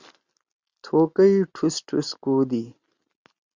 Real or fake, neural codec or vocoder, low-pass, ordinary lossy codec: real; none; 7.2 kHz; Opus, 64 kbps